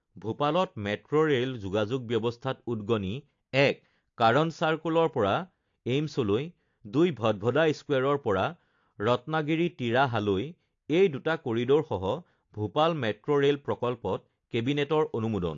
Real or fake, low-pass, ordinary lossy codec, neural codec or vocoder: real; 7.2 kHz; AAC, 48 kbps; none